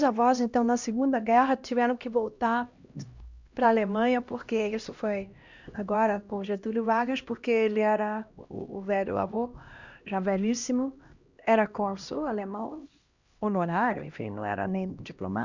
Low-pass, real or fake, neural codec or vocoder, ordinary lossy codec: 7.2 kHz; fake; codec, 16 kHz, 1 kbps, X-Codec, HuBERT features, trained on LibriSpeech; Opus, 64 kbps